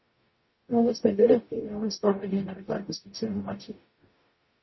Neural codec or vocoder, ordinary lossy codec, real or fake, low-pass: codec, 44.1 kHz, 0.9 kbps, DAC; MP3, 24 kbps; fake; 7.2 kHz